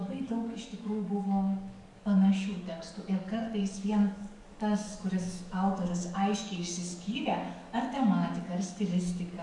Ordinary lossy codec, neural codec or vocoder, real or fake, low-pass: MP3, 96 kbps; codec, 44.1 kHz, 7.8 kbps, DAC; fake; 10.8 kHz